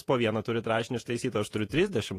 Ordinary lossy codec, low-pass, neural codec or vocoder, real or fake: AAC, 48 kbps; 14.4 kHz; vocoder, 48 kHz, 128 mel bands, Vocos; fake